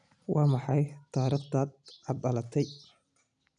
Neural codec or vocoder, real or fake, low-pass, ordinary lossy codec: none; real; 9.9 kHz; none